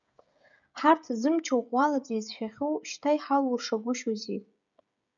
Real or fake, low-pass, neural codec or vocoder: fake; 7.2 kHz; codec, 16 kHz, 16 kbps, FreqCodec, smaller model